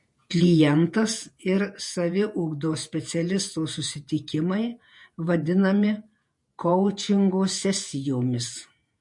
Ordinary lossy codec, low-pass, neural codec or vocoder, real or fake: MP3, 48 kbps; 10.8 kHz; vocoder, 48 kHz, 128 mel bands, Vocos; fake